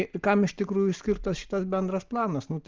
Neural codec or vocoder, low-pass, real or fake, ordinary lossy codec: none; 7.2 kHz; real; Opus, 32 kbps